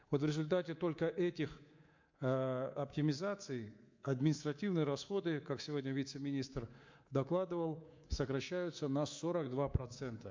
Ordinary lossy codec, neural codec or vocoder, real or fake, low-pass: MP3, 48 kbps; codec, 24 kHz, 3.1 kbps, DualCodec; fake; 7.2 kHz